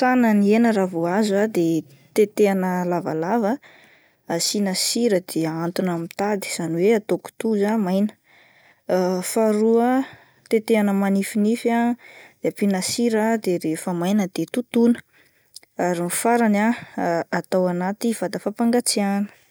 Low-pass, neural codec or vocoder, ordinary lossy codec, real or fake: none; none; none; real